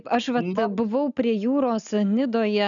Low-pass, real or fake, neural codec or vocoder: 7.2 kHz; real; none